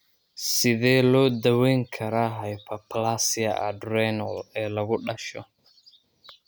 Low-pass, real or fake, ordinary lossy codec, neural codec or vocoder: none; real; none; none